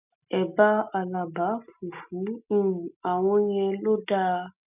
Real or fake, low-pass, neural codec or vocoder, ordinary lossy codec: real; 3.6 kHz; none; none